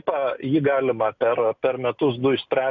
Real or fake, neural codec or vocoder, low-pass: real; none; 7.2 kHz